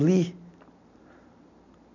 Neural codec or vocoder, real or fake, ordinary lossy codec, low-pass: none; real; none; 7.2 kHz